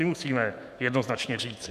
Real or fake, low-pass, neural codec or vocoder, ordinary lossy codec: fake; 14.4 kHz; autoencoder, 48 kHz, 128 numbers a frame, DAC-VAE, trained on Japanese speech; MP3, 96 kbps